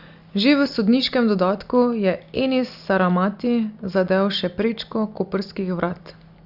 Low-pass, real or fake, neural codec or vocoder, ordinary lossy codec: 5.4 kHz; real; none; Opus, 64 kbps